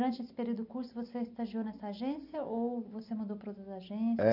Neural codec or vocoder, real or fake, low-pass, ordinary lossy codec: none; real; 5.4 kHz; none